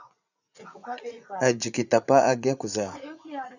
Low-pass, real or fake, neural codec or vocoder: 7.2 kHz; fake; vocoder, 44.1 kHz, 80 mel bands, Vocos